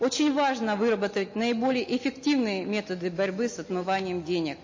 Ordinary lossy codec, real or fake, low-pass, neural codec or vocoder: MP3, 32 kbps; real; 7.2 kHz; none